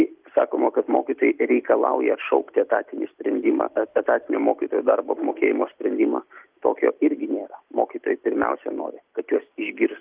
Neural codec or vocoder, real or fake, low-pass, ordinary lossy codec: none; real; 3.6 kHz; Opus, 32 kbps